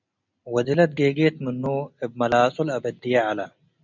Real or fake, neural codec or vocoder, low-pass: real; none; 7.2 kHz